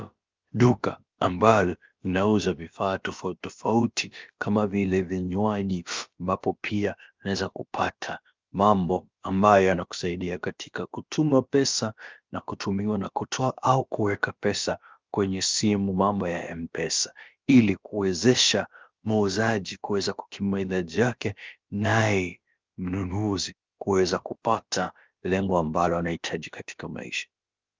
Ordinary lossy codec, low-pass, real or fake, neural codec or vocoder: Opus, 24 kbps; 7.2 kHz; fake; codec, 16 kHz, about 1 kbps, DyCAST, with the encoder's durations